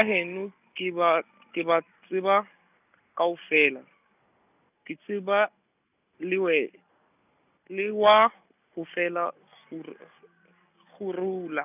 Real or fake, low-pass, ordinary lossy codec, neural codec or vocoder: real; 3.6 kHz; none; none